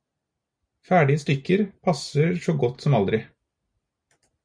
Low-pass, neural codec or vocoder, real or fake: 9.9 kHz; none; real